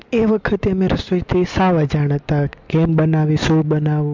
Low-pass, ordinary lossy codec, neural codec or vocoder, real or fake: 7.2 kHz; MP3, 64 kbps; none; real